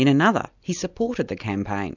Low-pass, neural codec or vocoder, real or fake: 7.2 kHz; none; real